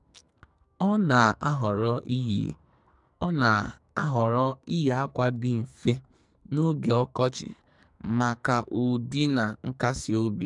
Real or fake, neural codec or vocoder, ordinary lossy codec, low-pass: fake; codec, 44.1 kHz, 2.6 kbps, SNAC; MP3, 96 kbps; 10.8 kHz